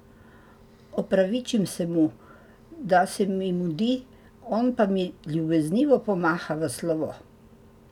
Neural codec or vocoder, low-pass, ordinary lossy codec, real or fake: none; 19.8 kHz; none; real